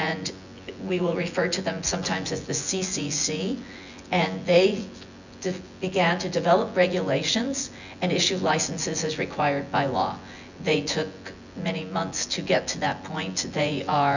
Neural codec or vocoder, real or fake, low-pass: vocoder, 24 kHz, 100 mel bands, Vocos; fake; 7.2 kHz